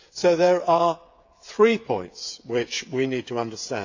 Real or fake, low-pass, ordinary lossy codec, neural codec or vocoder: fake; 7.2 kHz; AAC, 48 kbps; codec, 16 kHz, 8 kbps, FreqCodec, smaller model